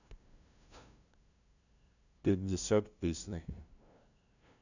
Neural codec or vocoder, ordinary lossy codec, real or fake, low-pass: codec, 16 kHz, 0.5 kbps, FunCodec, trained on LibriTTS, 25 frames a second; none; fake; 7.2 kHz